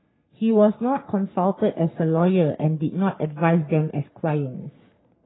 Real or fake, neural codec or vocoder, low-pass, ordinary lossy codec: fake; codec, 44.1 kHz, 3.4 kbps, Pupu-Codec; 7.2 kHz; AAC, 16 kbps